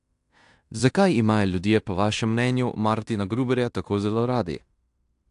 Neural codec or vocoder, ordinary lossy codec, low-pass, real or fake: codec, 16 kHz in and 24 kHz out, 0.9 kbps, LongCat-Audio-Codec, fine tuned four codebook decoder; AAC, 64 kbps; 10.8 kHz; fake